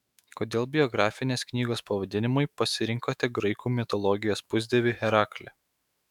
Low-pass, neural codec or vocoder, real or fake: 19.8 kHz; autoencoder, 48 kHz, 128 numbers a frame, DAC-VAE, trained on Japanese speech; fake